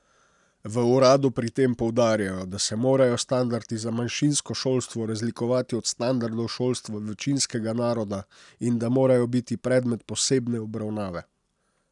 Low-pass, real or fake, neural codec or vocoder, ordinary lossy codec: 10.8 kHz; real; none; none